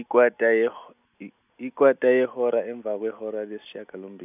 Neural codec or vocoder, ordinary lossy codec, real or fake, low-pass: none; none; real; 3.6 kHz